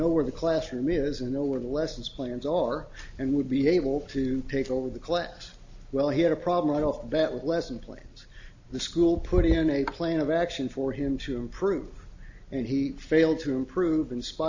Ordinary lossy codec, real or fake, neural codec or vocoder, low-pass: AAC, 48 kbps; real; none; 7.2 kHz